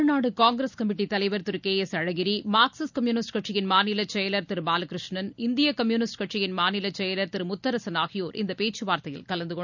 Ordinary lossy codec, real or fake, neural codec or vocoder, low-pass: none; real; none; 7.2 kHz